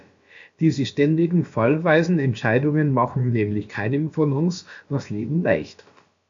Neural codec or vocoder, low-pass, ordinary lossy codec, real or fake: codec, 16 kHz, about 1 kbps, DyCAST, with the encoder's durations; 7.2 kHz; MP3, 64 kbps; fake